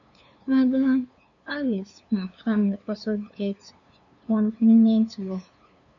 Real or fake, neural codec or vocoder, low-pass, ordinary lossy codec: fake; codec, 16 kHz, 2 kbps, FunCodec, trained on LibriTTS, 25 frames a second; 7.2 kHz; none